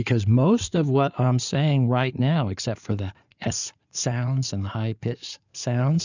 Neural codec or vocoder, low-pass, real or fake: codec, 16 kHz in and 24 kHz out, 2.2 kbps, FireRedTTS-2 codec; 7.2 kHz; fake